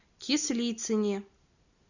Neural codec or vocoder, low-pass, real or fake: none; 7.2 kHz; real